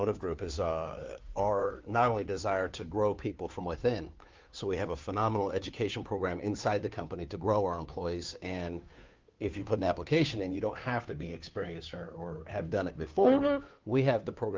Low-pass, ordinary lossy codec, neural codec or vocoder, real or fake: 7.2 kHz; Opus, 32 kbps; codec, 16 kHz, 2 kbps, FunCodec, trained on Chinese and English, 25 frames a second; fake